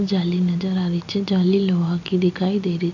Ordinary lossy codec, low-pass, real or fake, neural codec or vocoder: none; 7.2 kHz; real; none